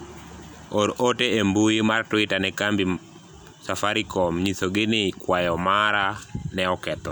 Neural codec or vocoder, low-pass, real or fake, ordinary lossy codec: none; none; real; none